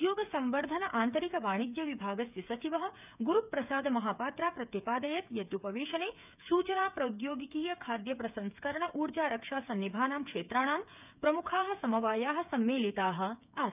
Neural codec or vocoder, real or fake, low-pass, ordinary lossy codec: codec, 16 kHz, 8 kbps, FreqCodec, smaller model; fake; 3.6 kHz; none